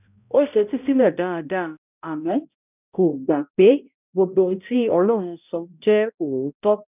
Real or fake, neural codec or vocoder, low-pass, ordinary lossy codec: fake; codec, 16 kHz, 0.5 kbps, X-Codec, HuBERT features, trained on balanced general audio; 3.6 kHz; none